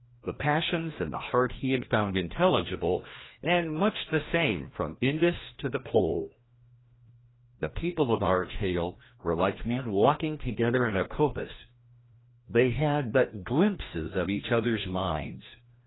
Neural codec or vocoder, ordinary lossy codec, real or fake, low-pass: codec, 16 kHz, 1 kbps, FreqCodec, larger model; AAC, 16 kbps; fake; 7.2 kHz